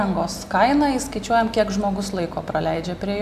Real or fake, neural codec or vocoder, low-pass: real; none; 14.4 kHz